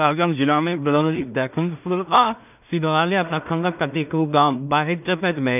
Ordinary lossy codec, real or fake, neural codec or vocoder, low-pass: none; fake; codec, 16 kHz in and 24 kHz out, 0.4 kbps, LongCat-Audio-Codec, two codebook decoder; 3.6 kHz